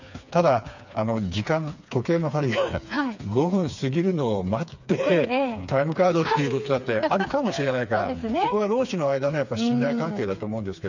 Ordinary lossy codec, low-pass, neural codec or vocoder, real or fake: none; 7.2 kHz; codec, 16 kHz, 4 kbps, FreqCodec, smaller model; fake